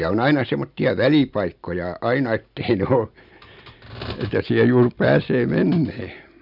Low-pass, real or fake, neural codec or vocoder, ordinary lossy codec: 5.4 kHz; real; none; none